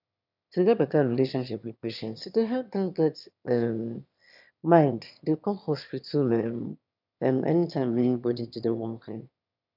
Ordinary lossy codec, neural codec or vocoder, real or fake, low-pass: none; autoencoder, 22.05 kHz, a latent of 192 numbers a frame, VITS, trained on one speaker; fake; 5.4 kHz